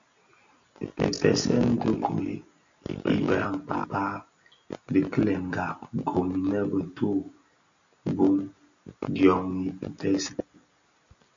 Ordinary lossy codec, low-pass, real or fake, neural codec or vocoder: MP3, 64 kbps; 7.2 kHz; real; none